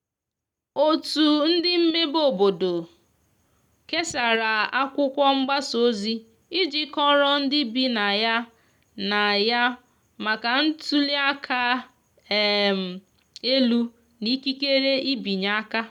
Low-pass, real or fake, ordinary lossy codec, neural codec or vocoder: 19.8 kHz; real; none; none